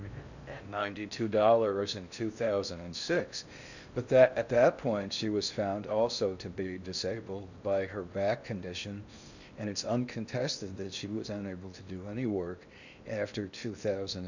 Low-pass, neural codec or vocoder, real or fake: 7.2 kHz; codec, 16 kHz in and 24 kHz out, 0.6 kbps, FocalCodec, streaming, 4096 codes; fake